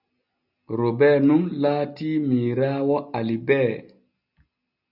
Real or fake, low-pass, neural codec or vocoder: real; 5.4 kHz; none